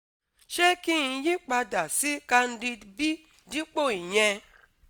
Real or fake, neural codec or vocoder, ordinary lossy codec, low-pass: real; none; none; none